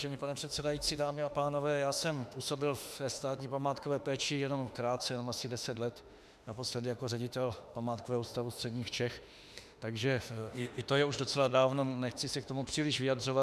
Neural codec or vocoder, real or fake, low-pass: autoencoder, 48 kHz, 32 numbers a frame, DAC-VAE, trained on Japanese speech; fake; 14.4 kHz